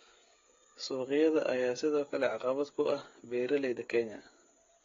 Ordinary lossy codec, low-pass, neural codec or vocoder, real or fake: AAC, 32 kbps; 7.2 kHz; codec, 16 kHz, 8 kbps, FreqCodec, smaller model; fake